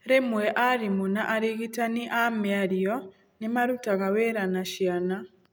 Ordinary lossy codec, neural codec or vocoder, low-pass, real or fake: none; none; none; real